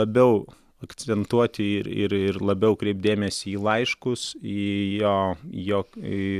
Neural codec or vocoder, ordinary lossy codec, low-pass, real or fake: none; Opus, 64 kbps; 14.4 kHz; real